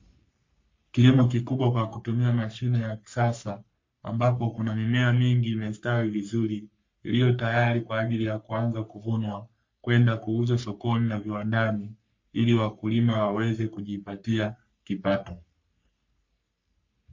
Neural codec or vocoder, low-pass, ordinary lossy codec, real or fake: codec, 44.1 kHz, 3.4 kbps, Pupu-Codec; 7.2 kHz; MP3, 48 kbps; fake